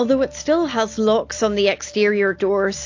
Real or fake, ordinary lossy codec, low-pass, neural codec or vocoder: real; AAC, 48 kbps; 7.2 kHz; none